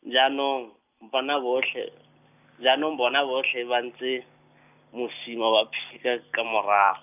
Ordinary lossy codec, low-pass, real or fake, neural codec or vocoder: none; 3.6 kHz; real; none